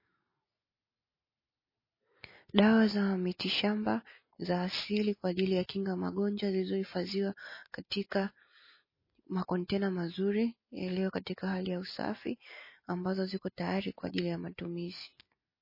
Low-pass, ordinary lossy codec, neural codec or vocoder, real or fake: 5.4 kHz; MP3, 24 kbps; none; real